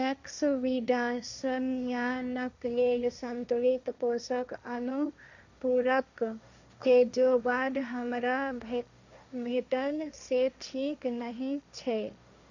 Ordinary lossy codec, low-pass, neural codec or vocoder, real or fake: none; 7.2 kHz; codec, 16 kHz, 1.1 kbps, Voila-Tokenizer; fake